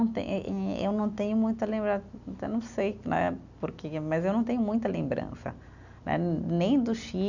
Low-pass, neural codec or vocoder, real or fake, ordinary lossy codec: 7.2 kHz; none; real; none